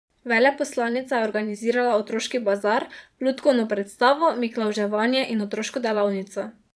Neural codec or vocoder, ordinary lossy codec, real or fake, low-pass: vocoder, 22.05 kHz, 80 mel bands, Vocos; none; fake; none